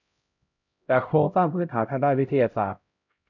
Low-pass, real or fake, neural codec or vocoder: 7.2 kHz; fake; codec, 16 kHz, 0.5 kbps, X-Codec, HuBERT features, trained on LibriSpeech